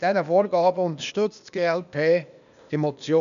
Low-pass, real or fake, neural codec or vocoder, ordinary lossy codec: 7.2 kHz; fake; codec, 16 kHz, 0.8 kbps, ZipCodec; none